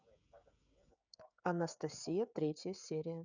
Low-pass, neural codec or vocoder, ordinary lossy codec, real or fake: 7.2 kHz; codec, 16 kHz, 16 kbps, FreqCodec, smaller model; none; fake